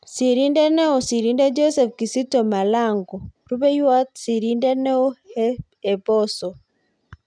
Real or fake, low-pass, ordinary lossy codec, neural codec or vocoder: real; 9.9 kHz; none; none